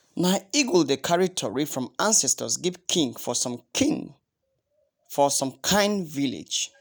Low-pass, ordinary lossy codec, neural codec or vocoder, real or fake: none; none; none; real